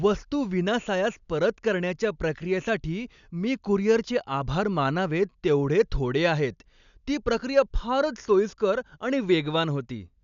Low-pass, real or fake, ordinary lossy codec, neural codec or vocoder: 7.2 kHz; real; none; none